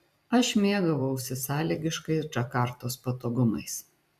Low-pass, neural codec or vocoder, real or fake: 14.4 kHz; vocoder, 44.1 kHz, 128 mel bands every 256 samples, BigVGAN v2; fake